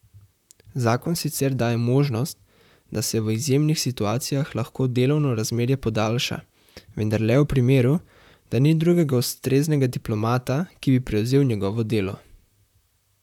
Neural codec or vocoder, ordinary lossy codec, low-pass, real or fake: vocoder, 44.1 kHz, 128 mel bands, Pupu-Vocoder; none; 19.8 kHz; fake